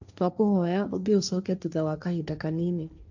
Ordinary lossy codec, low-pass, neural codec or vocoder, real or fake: none; 7.2 kHz; codec, 16 kHz, 1.1 kbps, Voila-Tokenizer; fake